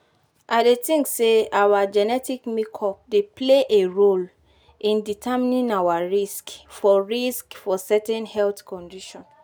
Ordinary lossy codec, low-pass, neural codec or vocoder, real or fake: none; none; none; real